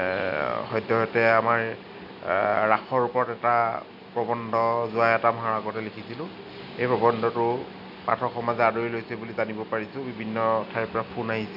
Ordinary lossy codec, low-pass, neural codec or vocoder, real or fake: none; 5.4 kHz; none; real